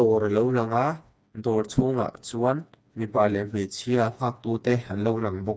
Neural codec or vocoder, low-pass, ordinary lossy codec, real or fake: codec, 16 kHz, 2 kbps, FreqCodec, smaller model; none; none; fake